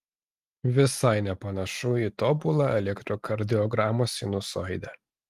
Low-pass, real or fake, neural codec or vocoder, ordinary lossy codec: 14.4 kHz; real; none; Opus, 24 kbps